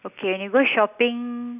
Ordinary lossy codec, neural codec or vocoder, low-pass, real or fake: none; none; 3.6 kHz; real